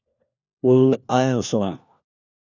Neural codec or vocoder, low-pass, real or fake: codec, 16 kHz, 1 kbps, FunCodec, trained on LibriTTS, 50 frames a second; 7.2 kHz; fake